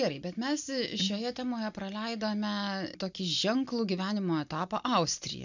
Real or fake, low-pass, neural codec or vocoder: real; 7.2 kHz; none